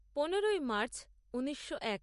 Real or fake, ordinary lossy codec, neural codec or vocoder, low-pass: real; MP3, 48 kbps; none; 14.4 kHz